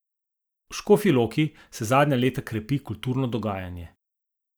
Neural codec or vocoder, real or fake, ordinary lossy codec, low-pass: none; real; none; none